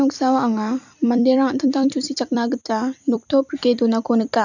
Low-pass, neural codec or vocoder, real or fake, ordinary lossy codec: 7.2 kHz; vocoder, 44.1 kHz, 128 mel bands every 256 samples, BigVGAN v2; fake; none